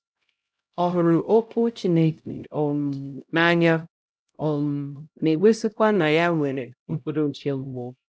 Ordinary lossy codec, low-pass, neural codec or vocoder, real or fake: none; none; codec, 16 kHz, 0.5 kbps, X-Codec, HuBERT features, trained on LibriSpeech; fake